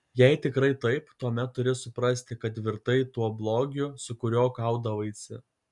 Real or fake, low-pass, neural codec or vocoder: real; 10.8 kHz; none